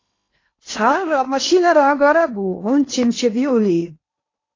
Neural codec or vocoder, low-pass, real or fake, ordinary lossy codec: codec, 16 kHz in and 24 kHz out, 0.8 kbps, FocalCodec, streaming, 65536 codes; 7.2 kHz; fake; AAC, 32 kbps